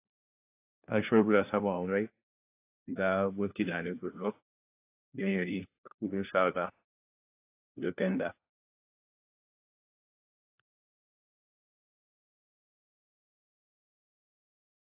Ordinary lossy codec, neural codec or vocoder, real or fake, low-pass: AAC, 24 kbps; codec, 16 kHz, 1 kbps, FunCodec, trained on LibriTTS, 50 frames a second; fake; 3.6 kHz